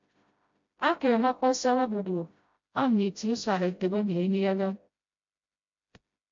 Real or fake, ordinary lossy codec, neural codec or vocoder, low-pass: fake; MP3, 48 kbps; codec, 16 kHz, 0.5 kbps, FreqCodec, smaller model; 7.2 kHz